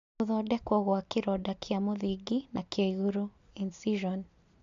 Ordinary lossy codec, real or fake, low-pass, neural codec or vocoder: AAC, 64 kbps; real; 7.2 kHz; none